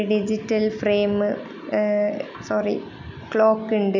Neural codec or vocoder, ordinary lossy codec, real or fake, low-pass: none; none; real; 7.2 kHz